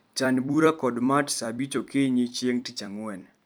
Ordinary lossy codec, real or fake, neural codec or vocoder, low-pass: none; fake; vocoder, 44.1 kHz, 128 mel bands every 256 samples, BigVGAN v2; none